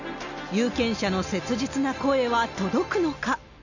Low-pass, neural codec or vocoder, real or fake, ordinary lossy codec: 7.2 kHz; none; real; none